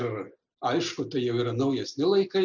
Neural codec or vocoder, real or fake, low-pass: none; real; 7.2 kHz